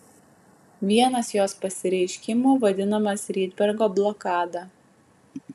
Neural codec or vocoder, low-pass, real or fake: none; 14.4 kHz; real